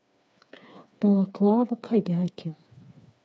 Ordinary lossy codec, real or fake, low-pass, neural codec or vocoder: none; fake; none; codec, 16 kHz, 4 kbps, FreqCodec, smaller model